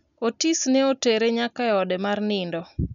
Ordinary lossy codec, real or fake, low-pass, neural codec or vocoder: none; real; 7.2 kHz; none